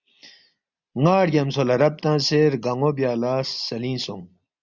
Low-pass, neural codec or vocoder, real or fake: 7.2 kHz; none; real